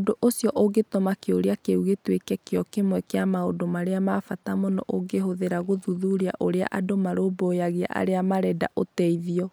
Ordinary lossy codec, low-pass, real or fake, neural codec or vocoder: none; none; real; none